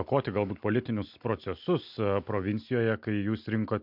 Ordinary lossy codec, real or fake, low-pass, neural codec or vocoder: MP3, 48 kbps; real; 5.4 kHz; none